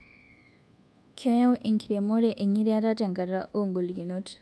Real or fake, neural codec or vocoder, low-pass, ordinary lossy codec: fake; codec, 24 kHz, 1.2 kbps, DualCodec; none; none